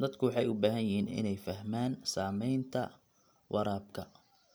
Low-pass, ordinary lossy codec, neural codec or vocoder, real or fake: none; none; none; real